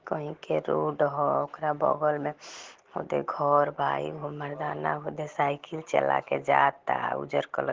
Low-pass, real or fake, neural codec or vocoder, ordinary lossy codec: 7.2 kHz; real; none; Opus, 16 kbps